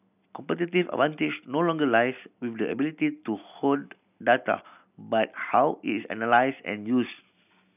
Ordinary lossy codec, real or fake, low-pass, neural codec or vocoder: none; real; 3.6 kHz; none